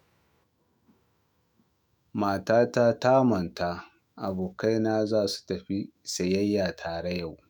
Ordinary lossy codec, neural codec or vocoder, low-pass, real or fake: none; autoencoder, 48 kHz, 128 numbers a frame, DAC-VAE, trained on Japanese speech; none; fake